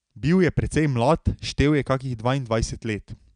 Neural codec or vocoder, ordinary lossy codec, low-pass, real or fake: none; none; 9.9 kHz; real